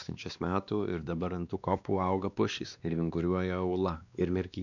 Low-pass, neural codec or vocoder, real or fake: 7.2 kHz; codec, 16 kHz, 2 kbps, X-Codec, WavLM features, trained on Multilingual LibriSpeech; fake